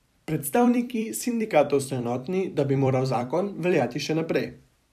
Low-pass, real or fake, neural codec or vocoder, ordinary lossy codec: 14.4 kHz; fake; vocoder, 44.1 kHz, 128 mel bands every 256 samples, BigVGAN v2; none